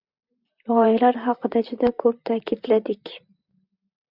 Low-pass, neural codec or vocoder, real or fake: 5.4 kHz; vocoder, 44.1 kHz, 128 mel bands, Pupu-Vocoder; fake